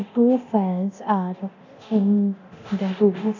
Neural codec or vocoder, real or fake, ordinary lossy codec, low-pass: codec, 24 kHz, 0.9 kbps, DualCodec; fake; none; 7.2 kHz